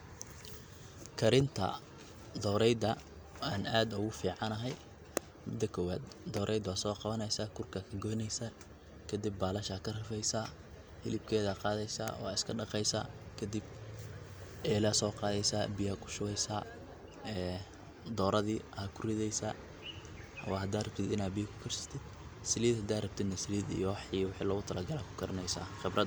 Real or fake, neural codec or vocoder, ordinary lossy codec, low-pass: real; none; none; none